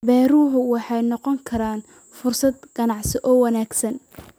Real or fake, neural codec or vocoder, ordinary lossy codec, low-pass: real; none; none; none